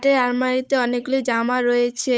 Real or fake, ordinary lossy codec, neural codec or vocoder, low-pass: fake; none; codec, 16 kHz, 8 kbps, FunCodec, trained on Chinese and English, 25 frames a second; none